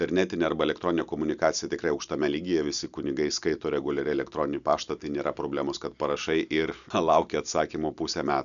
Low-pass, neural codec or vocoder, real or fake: 7.2 kHz; none; real